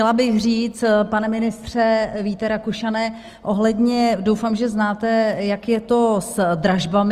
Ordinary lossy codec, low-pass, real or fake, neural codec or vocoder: Opus, 24 kbps; 14.4 kHz; real; none